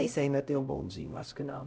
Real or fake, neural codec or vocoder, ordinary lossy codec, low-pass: fake; codec, 16 kHz, 0.5 kbps, X-Codec, HuBERT features, trained on LibriSpeech; none; none